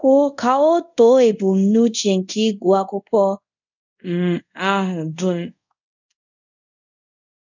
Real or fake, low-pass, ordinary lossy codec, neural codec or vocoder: fake; 7.2 kHz; none; codec, 24 kHz, 0.5 kbps, DualCodec